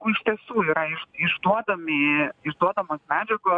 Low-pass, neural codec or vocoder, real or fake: 9.9 kHz; none; real